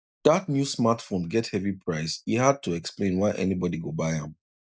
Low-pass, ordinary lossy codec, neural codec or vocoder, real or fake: none; none; none; real